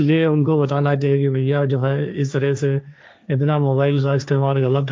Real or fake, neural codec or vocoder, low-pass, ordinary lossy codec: fake; codec, 16 kHz, 1.1 kbps, Voila-Tokenizer; none; none